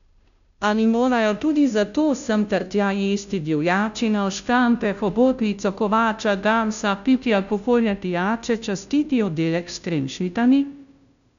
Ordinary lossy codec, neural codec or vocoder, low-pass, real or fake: MP3, 96 kbps; codec, 16 kHz, 0.5 kbps, FunCodec, trained on Chinese and English, 25 frames a second; 7.2 kHz; fake